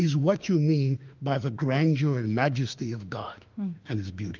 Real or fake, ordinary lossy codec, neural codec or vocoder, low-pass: fake; Opus, 24 kbps; autoencoder, 48 kHz, 32 numbers a frame, DAC-VAE, trained on Japanese speech; 7.2 kHz